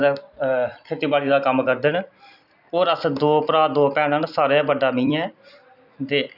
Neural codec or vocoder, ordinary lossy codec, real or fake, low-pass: vocoder, 44.1 kHz, 128 mel bands every 256 samples, BigVGAN v2; none; fake; 5.4 kHz